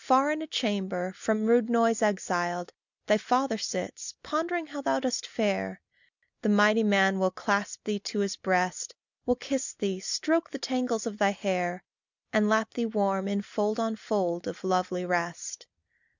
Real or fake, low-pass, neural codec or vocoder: real; 7.2 kHz; none